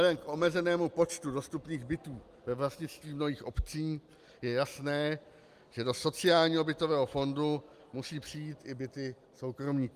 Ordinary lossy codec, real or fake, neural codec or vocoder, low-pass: Opus, 32 kbps; real; none; 14.4 kHz